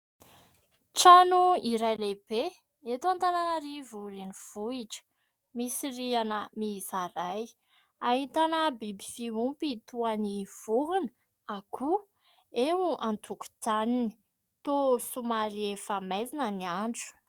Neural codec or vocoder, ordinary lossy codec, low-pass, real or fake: codec, 44.1 kHz, 7.8 kbps, DAC; Opus, 64 kbps; 19.8 kHz; fake